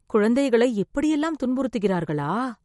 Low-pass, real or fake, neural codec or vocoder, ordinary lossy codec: 19.8 kHz; real; none; MP3, 48 kbps